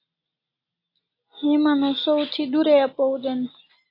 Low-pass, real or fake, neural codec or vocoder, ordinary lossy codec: 5.4 kHz; real; none; AAC, 32 kbps